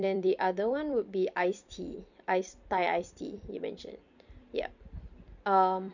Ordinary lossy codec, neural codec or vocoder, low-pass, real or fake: none; vocoder, 44.1 kHz, 128 mel bands every 256 samples, BigVGAN v2; 7.2 kHz; fake